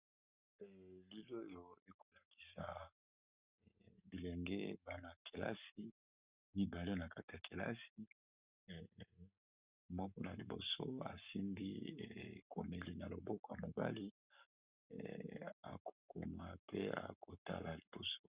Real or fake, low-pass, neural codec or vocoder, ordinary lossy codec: fake; 3.6 kHz; codec, 44.1 kHz, 7.8 kbps, Pupu-Codec; Opus, 64 kbps